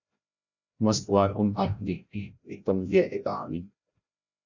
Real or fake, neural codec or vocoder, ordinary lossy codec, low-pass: fake; codec, 16 kHz, 0.5 kbps, FreqCodec, larger model; Opus, 64 kbps; 7.2 kHz